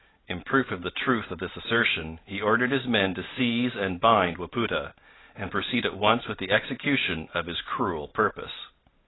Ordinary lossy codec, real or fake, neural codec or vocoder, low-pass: AAC, 16 kbps; real; none; 7.2 kHz